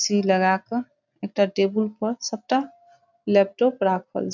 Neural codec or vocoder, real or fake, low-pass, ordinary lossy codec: none; real; 7.2 kHz; none